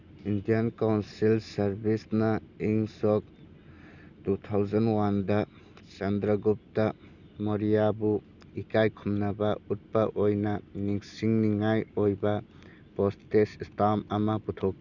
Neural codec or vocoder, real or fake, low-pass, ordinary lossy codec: none; real; 7.2 kHz; none